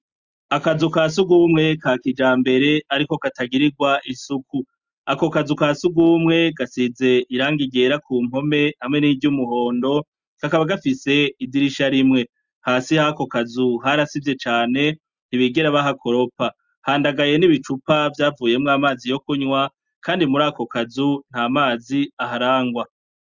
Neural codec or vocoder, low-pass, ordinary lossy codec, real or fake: none; 7.2 kHz; Opus, 64 kbps; real